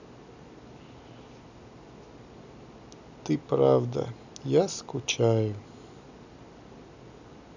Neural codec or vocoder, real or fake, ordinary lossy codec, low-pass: none; real; none; 7.2 kHz